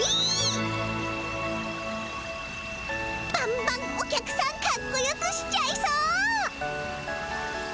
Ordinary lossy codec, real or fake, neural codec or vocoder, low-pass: none; real; none; none